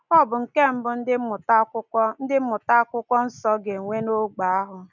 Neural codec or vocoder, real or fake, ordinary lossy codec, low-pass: none; real; none; 7.2 kHz